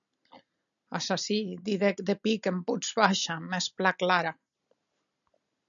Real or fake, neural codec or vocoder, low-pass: real; none; 7.2 kHz